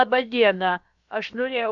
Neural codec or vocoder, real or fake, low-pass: codec, 16 kHz, about 1 kbps, DyCAST, with the encoder's durations; fake; 7.2 kHz